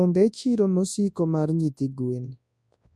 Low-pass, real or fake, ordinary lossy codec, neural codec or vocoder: none; fake; none; codec, 24 kHz, 0.9 kbps, WavTokenizer, large speech release